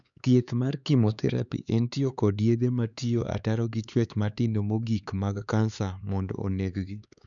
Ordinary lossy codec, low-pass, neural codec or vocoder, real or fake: none; 7.2 kHz; codec, 16 kHz, 4 kbps, X-Codec, HuBERT features, trained on LibriSpeech; fake